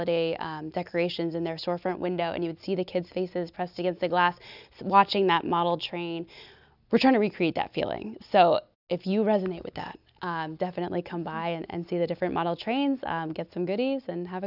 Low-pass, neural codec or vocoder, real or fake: 5.4 kHz; none; real